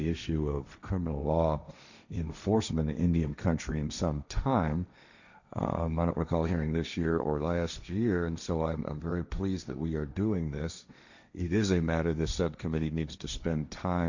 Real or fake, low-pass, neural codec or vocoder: fake; 7.2 kHz; codec, 16 kHz, 1.1 kbps, Voila-Tokenizer